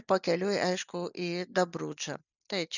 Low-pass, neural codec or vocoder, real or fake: 7.2 kHz; none; real